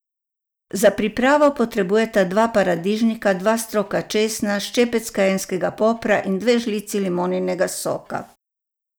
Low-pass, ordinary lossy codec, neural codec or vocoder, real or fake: none; none; none; real